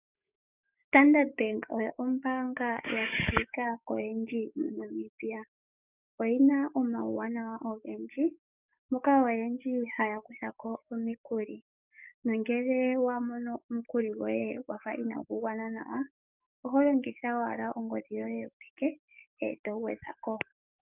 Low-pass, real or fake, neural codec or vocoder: 3.6 kHz; fake; codec, 44.1 kHz, 7.8 kbps, DAC